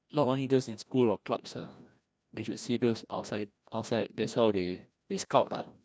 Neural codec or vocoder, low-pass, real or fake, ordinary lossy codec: codec, 16 kHz, 1 kbps, FreqCodec, larger model; none; fake; none